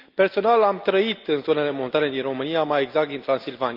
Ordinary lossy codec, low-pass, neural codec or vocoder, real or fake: Opus, 24 kbps; 5.4 kHz; none; real